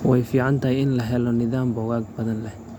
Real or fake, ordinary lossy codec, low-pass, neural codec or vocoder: real; MP3, 96 kbps; 19.8 kHz; none